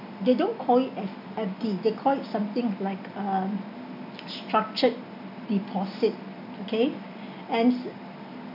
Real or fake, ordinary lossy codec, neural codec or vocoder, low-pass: real; none; none; 5.4 kHz